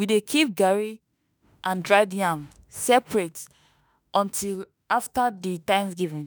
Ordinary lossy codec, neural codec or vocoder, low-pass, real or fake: none; autoencoder, 48 kHz, 32 numbers a frame, DAC-VAE, trained on Japanese speech; none; fake